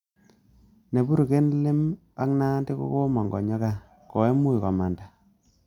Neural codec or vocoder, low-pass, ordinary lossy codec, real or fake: none; 19.8 kHz; none; real